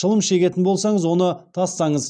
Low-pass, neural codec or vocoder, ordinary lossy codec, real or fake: 9.9 kHz; none; none; real